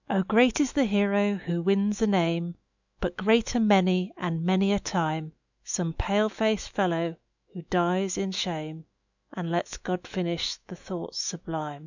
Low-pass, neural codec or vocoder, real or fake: 7.2 kHz; autoencoder, 48 kHz, 128 numbers a frame, DAC-VAE, trained on Japanese speech; fake